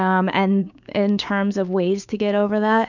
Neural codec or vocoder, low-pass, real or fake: codec, 16 kHz, 6 kbps, DAC; 7.2 kHz; fake